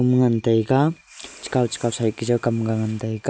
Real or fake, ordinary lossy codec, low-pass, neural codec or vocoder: real; none; none; none